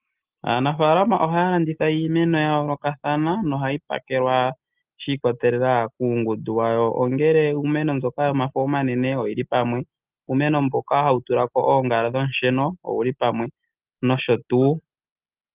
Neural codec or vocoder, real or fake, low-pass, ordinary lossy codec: none; real; 3.6 kHz; Opus, 24 kbps